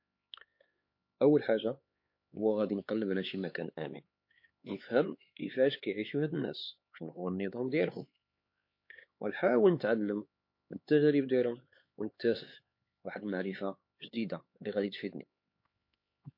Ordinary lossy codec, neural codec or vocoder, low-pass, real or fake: MP3, 32 kbps; codec, 16 kHz, 4 kbps, X-Codec, HuBERT features, trained on LibriSpeech; 5.4 kHz; fake